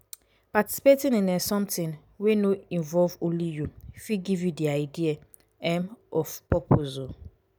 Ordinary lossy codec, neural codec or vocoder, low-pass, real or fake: none; none; none; real